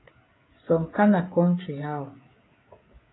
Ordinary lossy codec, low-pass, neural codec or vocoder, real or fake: AAC, 16 kbps; 7.2 kHz; codec, 16 kHz, 16 kbps, FreqCodec, smaller model; fake